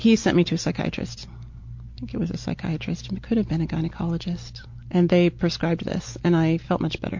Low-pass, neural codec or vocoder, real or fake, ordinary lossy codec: 7.2 kHz; none; real; MP3, 48 kbps